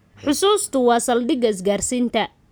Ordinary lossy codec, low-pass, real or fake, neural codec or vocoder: none; none; real; none